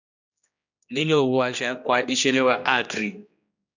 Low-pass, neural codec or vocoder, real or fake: 7.2 kHz; codec, 16 kHz, 1 kbps, X-Codec, HuBERT features, trained on general audio; fake